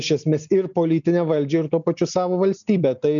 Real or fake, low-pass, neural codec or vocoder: real; 7.2 kHz; none